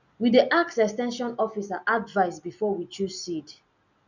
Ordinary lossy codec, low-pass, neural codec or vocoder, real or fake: none; 7.2 kHz; none; real